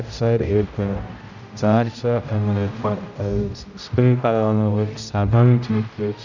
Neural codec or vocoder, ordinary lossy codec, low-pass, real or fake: codec, 16 kHz, 0.5 kbps, X-Codec, HuBERT features, trained on general audio; none; 7.2 kHz; fake